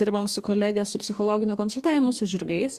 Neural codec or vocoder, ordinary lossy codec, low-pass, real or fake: codec, 44.1 kHz, 2.6 kbps, DAC; Opus, 64 kbps; 14.4 kHz; fake